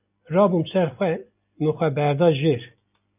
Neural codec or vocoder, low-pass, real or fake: none; 3.6 kHz; real